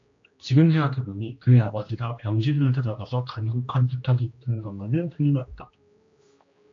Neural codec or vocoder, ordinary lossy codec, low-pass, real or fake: codec, 16 kHz, 1 kbps, X-Codec, HuBERT features, trained on general audio; AAC, 48 kbps; 7.2 kHz; fake